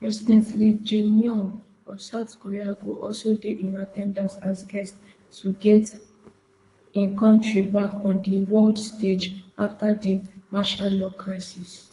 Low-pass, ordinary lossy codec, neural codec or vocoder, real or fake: 10.8 kHz; AAC, 48 kbps; codec, 24 kHz, 3 kbps, HILCodec; fake